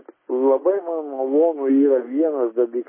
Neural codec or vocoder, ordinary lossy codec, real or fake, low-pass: none; MP3, 16 kbps; real; 3.6 kHz